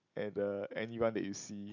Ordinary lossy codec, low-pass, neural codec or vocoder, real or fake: MP3, 64 kbps; 7.2 kHz; none; real